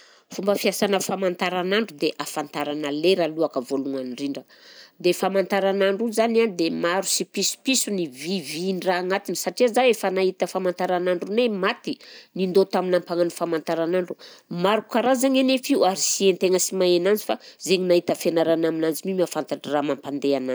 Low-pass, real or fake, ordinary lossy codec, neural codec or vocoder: none; real; none; none